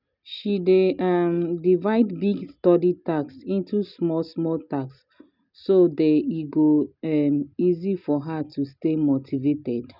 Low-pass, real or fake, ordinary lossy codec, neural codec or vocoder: 5.4 kHz; real; none; none